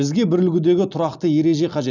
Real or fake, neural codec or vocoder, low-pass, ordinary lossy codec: real; none; 7.2 kHz; none